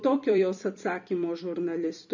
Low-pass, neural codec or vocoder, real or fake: 7.2 kHz; vocoder, 44.1 kHz, 128 mel bands every 256 samples, BigVGAN v2; fake